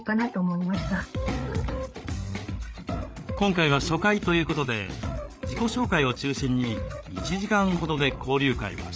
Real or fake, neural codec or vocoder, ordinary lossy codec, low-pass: fake; codec, 16 kHz, 8 kbps, FreqCodec, larger model; none; none